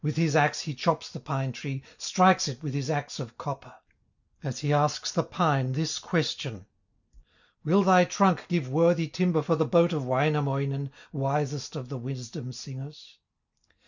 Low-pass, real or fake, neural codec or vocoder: 7.2 kHz; real; none